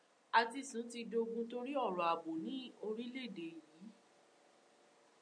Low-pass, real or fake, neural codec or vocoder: 9.9 kHz; real; none